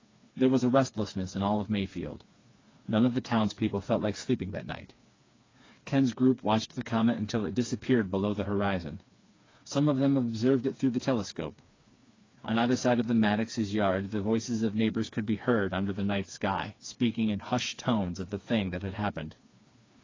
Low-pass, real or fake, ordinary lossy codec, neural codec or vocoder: 7.2 kHz; fake; AAC, 32 kbps; codec, 16 kHz, 4 kbps, FreqCodec, smaller model